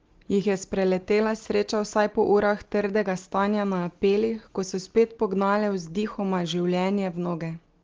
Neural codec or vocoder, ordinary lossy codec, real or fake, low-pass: none; Opus, 16 kbps; real; 7.2 kHz